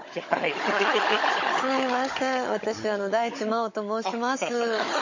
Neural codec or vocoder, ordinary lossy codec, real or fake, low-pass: codec, 16 kHz, 16 kbps, FunCodec, trained on Chinese and English, 50 frames a second; MP3, 32 kbps; fake; 7.2 kHz